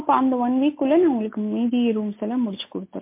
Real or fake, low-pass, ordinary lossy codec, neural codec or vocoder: real; 3.6 kHz; MP3, 24 kbps; none